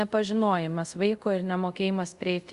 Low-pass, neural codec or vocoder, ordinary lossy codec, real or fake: 10.8 kHz; codec, 24 kHz, 0.5 kbps, DualCodec; Opus, 64 kbps; fake